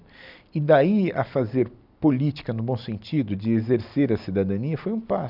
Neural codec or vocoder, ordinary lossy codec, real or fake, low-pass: none; none; real; 5.4 kHz